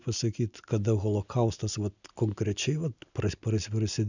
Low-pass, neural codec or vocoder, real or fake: 7.2 kHz; none; real